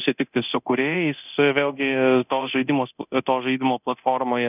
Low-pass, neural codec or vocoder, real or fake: 3.6 kHz; codec, 24 kHz, 0.9 kbps, DualCodec; fake